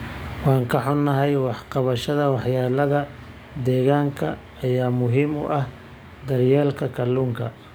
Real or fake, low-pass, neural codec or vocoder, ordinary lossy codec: fake; none; codec, 44.1 kHz, 7.8 kbps, DAC; none